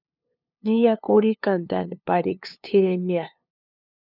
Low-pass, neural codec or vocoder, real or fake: 5.4 kHz; codec, 16 kHz, 2 kbps, FunCodec, trained on LibriTTS, 25 frames a second; fake